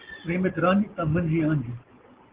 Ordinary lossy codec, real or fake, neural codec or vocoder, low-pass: Opus, 16 kbps; real; none; 3.6 kHz